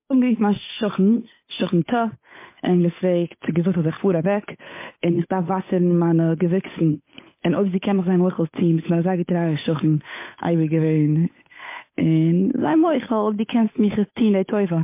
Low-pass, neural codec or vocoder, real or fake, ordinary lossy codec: 3.6 kHz; codec, 16 kHz, 8 kbps, FunCodec, trained on Chinese and English, 25 frames a second; fake; MP3, 24 kbps